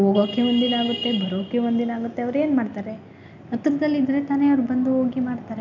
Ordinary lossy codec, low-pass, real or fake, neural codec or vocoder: none; 7.2 kHz; real; none